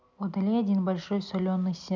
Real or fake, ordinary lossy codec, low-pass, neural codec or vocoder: real; none; 7.2 kHz; none